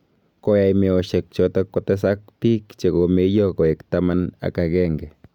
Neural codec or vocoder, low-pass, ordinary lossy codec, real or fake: none; 19.8 kHz; none; real